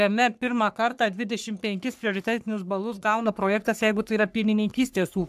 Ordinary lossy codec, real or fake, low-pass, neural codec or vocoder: AAC, 96 kbps; fake; 14.4 kHz; codec, 44.1 kHz, 3.4 kbps, Pupu-Codec